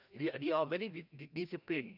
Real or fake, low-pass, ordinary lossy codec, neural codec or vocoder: fake; 5.4 kHz; none; codec, 16 kHz, 1 kbps, FreqCodec, larger model